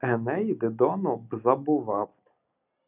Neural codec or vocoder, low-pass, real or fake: none; 3.6 kHz; real